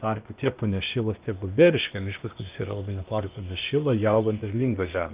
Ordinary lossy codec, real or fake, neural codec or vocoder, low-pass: Opus, 32 kbps; fake; codec, 16 kHz, 0.8 kbps, ZipCodec; 3.6 kHz